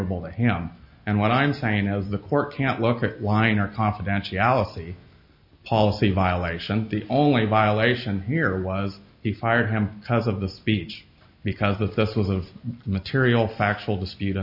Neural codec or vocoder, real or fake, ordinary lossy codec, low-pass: none; real; MP3, 32 kbps; 5.4 kHz